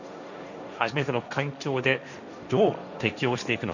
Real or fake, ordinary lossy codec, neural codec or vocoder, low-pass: fake; none; codec, 16 kHz, 1.1 kbps, Voila-Tokenizer; 7.2 kHz